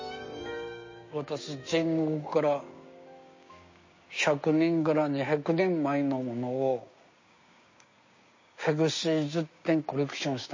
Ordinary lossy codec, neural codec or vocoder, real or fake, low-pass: MP3, 32 kbps; codec, 16 kHz, 6 kbps, DAC; fake; 7.2 kHz